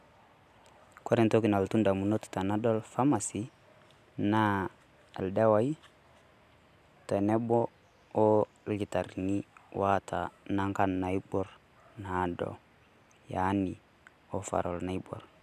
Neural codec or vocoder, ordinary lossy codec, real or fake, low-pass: none; none; real; 14.4 kHz